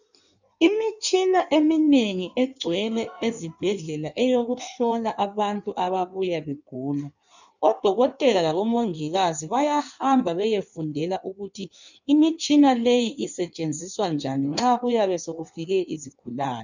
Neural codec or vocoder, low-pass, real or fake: codec, 16 kHz in and 24 kHz out, 1.1 kbps, FireRedTTS-2 codec; 7.2 kHz; fake